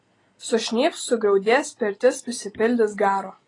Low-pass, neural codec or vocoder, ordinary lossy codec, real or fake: 10.8 kHz; none; AAC, 32 kbps; real